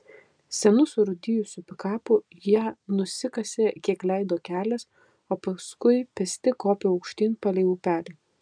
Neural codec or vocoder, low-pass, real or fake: none; 9.9 kHz; real